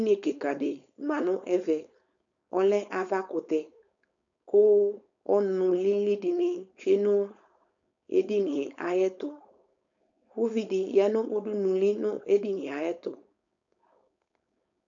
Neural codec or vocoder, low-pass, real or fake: codec, 16 kHz, 4.8 kbps, FACodec; 7.2 kHz; fake